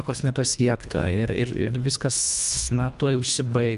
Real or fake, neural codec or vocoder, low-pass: fake; codec, 24 kHz, 1.5 kbps, HILCodec; 10.8 kHz